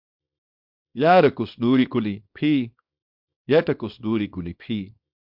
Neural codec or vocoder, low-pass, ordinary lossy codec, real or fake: codec, 24 kHz, 0.9 kbps, WavTokenizer, small release; 5.4 kHz; MP3, 48 kbps; fake